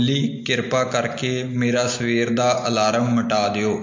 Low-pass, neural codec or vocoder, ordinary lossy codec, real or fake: 7.2 kHz; none; MP3, 48 kbps; real